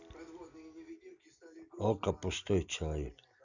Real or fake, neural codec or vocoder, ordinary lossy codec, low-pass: real; none; none; 7.2 kHz